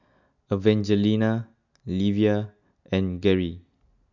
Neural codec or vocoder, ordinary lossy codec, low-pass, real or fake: none; none; 7.2 kHz; real